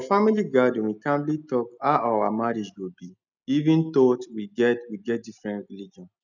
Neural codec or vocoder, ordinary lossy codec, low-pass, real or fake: none; none; 7.2 kHz; real